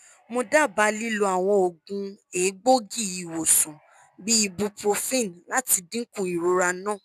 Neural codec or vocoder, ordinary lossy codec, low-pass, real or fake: none; none; 14.4 kHz; real